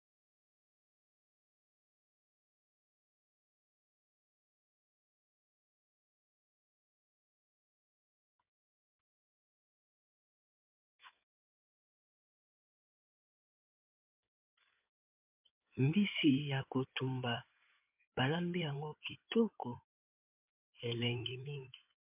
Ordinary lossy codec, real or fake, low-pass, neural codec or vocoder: MP3, 32 kbps; fake; 3.6 kHz; codec, 16 kHz in and 24 kHz out, 2.2 kbps, FireRedTTS-2 codec